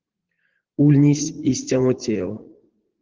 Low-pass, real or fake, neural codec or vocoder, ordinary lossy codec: 7.2 kHz; fake; vocoder, 44.1 kHz, 80 mel bands, Vocos; Opus, 16 kbps